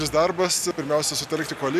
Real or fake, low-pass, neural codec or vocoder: real; 14.4 kHz; none